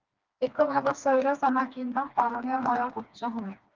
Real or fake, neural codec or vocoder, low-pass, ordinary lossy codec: fake; codec, 16 kHz, 2 kbps, FreqCodec, smaller model; 7.2 kHz; Opus, 32 kbps